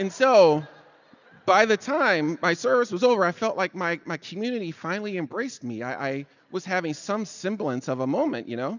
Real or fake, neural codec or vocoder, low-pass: real; none; 7.2 kHz